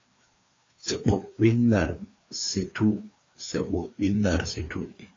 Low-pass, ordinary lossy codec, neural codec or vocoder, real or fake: 7.2 kHz; AAC, 32 kbps; codec, 16 kHz, 2 kbps, FreqCodec, larger model; fake